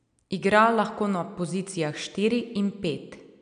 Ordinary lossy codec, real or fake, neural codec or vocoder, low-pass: none; real; none; 9.9 kHz